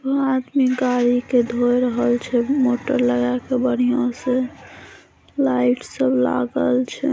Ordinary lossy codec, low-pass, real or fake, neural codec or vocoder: none; none; real; none